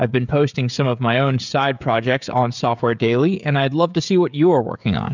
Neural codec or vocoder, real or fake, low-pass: codec, 16 kHz, 16 kbps, FreqCodec, smaller model; fake; 7.2 kHz